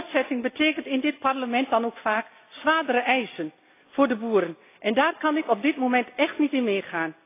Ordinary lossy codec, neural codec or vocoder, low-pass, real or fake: AAC, 24 kbps; none; 3.6 kHz; real